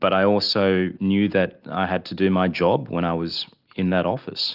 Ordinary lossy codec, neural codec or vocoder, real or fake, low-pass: Opus, 32 kbps; none; real; 5.4 kHz